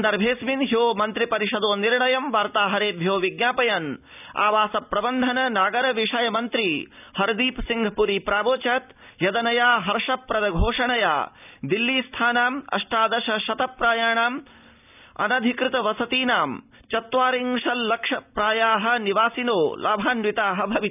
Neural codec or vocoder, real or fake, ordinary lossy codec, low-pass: none; real; none; 3.6 kHz